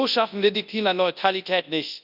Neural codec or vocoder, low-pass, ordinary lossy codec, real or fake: codec, 24 kHz, 0.9 kbps, WavTokenizer, large speech release; 5.4 kHz; none; fake